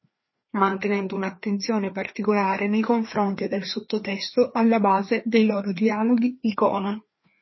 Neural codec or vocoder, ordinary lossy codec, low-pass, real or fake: codec, 16 kHz, 2 kbps, FreqCodec, larger model; MP3, 24 kbps; 7.2 kHz; fake